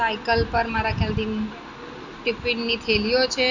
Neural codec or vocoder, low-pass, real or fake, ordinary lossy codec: none; 7.2 kHz; real; none